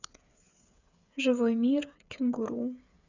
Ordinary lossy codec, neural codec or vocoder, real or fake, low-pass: none; codec, 16 kHz, 8 kbps, FreqCodec, smaller model; fake; 7.2 kHz